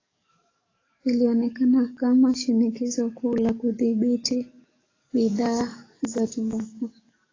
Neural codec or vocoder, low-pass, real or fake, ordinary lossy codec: codec, 44.1 kHz, 7.8 kbps, DAC; 7.2 kHz; fake; AAC, 32 kbps